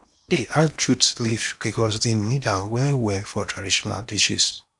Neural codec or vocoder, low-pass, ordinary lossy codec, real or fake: codec, 16 kHz in and 24 kHz out, 0.8 kbps, FocalCodec, streaming, 65536 codes; 10.8 kHz; none; fake